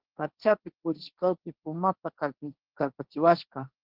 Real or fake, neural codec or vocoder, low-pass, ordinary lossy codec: fake; codec, 16 kHz, 1.1 kbps, Voila-Tokenizer; 5.4 kHz; Opus, 16 kbps